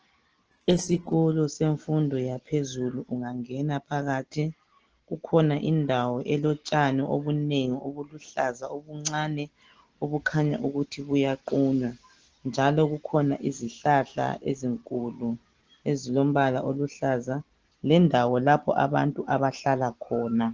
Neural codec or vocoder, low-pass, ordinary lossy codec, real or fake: none; 7.2 kHz; Opus, 16 kbps; real